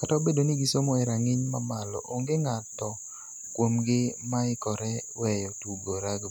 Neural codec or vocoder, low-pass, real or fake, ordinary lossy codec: none; none; real; none